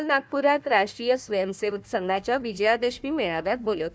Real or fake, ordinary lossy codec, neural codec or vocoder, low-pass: fake; none; codec, 16 kHz, 1 kbps, FunCodec, trained on Chinese and English, 50 frames a second; none